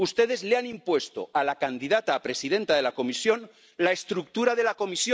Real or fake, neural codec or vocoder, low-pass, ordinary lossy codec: real; none; none; none